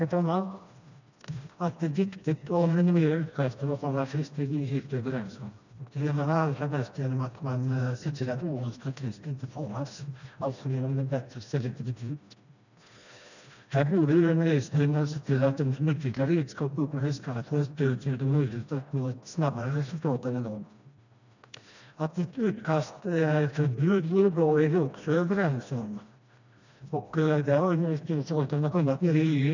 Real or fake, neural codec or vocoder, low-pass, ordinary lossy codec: fake; codec, 16 kHz, 1 kbps, FreqCodec, smaller model; 7.2 kHz; none